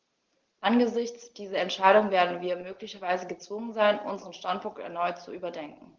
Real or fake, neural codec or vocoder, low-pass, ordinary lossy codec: real; none; 7.2 kHz; Opus, 16 kbps